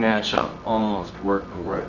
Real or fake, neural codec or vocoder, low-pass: fake; codec, 24 kHz, 0.9 kbps, WavTokenizer, medium music audio release; 7.2 kHz